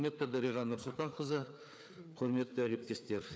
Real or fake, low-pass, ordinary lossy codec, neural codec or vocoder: fake; none; none; codec, 16 kHz, 4 kbps, FreqCodec, larger model